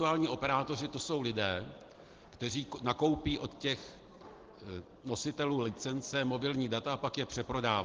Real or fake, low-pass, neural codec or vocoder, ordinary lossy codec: real; 7.2 kHz; none; Opus, 16 kbps